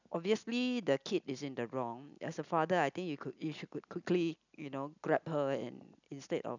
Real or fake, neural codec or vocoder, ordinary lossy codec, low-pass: real; none; none; 7.2 kHz